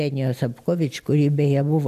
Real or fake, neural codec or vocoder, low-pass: real; none; 14.4 kHz